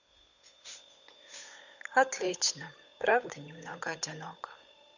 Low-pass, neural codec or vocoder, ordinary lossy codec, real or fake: 7.2 kHz; codec, 16 kHz, 8 kbps, FunCodec, trained on Chinese and English, 25 frames a second; none; fake